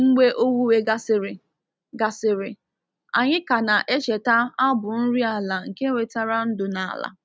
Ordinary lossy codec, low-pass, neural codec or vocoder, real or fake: none; none; none; real